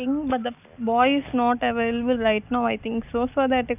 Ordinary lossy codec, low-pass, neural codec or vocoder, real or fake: none; 3.6 kHz; none; real